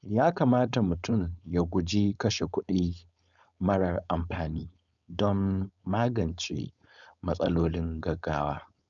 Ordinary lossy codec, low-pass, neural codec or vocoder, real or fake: none; 7.2 kHz; codec, 16 kHz, 4.8 kbps, FACodec; fake